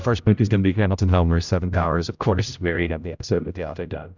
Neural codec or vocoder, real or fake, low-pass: codec, 16 kHz, 0.5 kbps, X-Codec, HuBERT features, trained on general audio; fake; 7.2 kHz